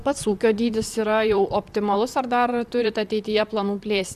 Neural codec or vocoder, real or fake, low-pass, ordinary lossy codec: vocoder, 44.1 kHz, 128 mel bands, Pupu-Vocoder; fake; 14.4 kHz; Opus, 64 kbps